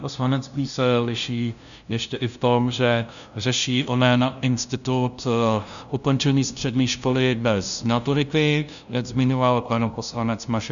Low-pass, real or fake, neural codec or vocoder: 7.2 kHz; fake; codec, 16 kHz, 0.5 kbps, FunCodec, trained on LibriTTS, 25 frames a second